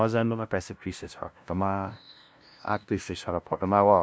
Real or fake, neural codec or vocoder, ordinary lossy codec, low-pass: fake; codec, 16 kHz, 0.5 kbps, FunCodec, trained on LibriTTS, 25 frames a second; none; none